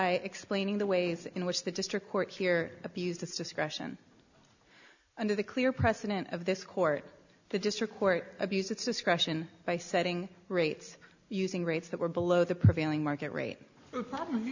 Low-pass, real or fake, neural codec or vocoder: 7.2 kHz; real; none